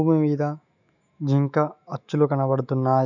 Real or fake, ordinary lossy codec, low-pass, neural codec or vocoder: fake; none; 7.2 kHz; autoencoder, 48 kHz, 128 numbers a frame, DAC-VAE, trained on Japanese speech